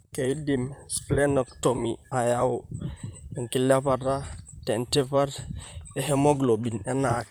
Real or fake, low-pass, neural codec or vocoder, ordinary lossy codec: fake; none; vocoder, 44.1 kHz, 128 mel bands, Pupu-Vocoder; none